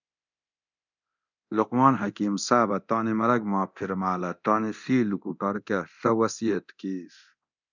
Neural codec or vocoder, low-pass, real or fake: codec, 24 kHz, 0.9 kbps, DualCodec; 7.2 kHz; fake